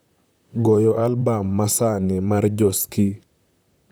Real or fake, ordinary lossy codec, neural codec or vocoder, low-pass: fake; none; vocoder, 44.1 kHz, 128 mel bands, Pupu-Vocoder; none